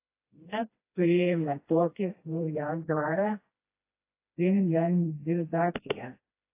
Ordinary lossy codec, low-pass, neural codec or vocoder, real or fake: AAC, 24 kbps; 3.6 kHz; codec, 16 kHz, 1 kbps, FreqCodec, smaller model; fake